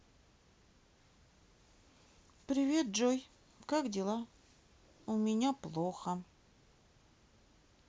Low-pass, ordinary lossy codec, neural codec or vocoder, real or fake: none; none; none; real